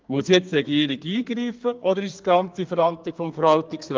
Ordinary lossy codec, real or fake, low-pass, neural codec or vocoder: Opus, 32 kbps; fake; 7.2 kHz; codec, 32 kHz, 1.9 kbps, SNAC